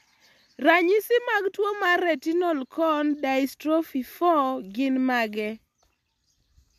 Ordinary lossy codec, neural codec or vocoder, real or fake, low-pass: MP3, 96 kbps; none; real; 14.4 kHz